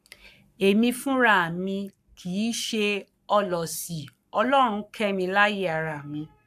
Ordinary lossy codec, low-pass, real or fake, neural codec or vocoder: AAC, 96 kbps; 14.4 kHz; fake; codec, 44.1 kHz, 7.8 kbps, Pupu-Codec